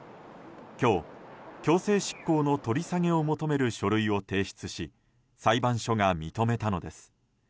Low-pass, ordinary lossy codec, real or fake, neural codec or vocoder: none; none; real; none